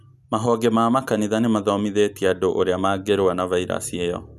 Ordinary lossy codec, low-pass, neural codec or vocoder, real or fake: none; 14.4 kHz; none; real